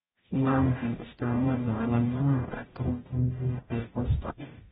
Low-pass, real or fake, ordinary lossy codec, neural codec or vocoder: 19.8 kHz; fake; AAC, 16 kbps; codec, 44.1 kHz, 0.9 kbps, DAC